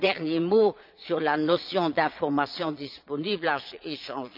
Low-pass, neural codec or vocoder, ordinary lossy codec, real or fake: 5.4 kHz; vocoder, 22.05 kHz, 80 mel bands, Vocos; none; fake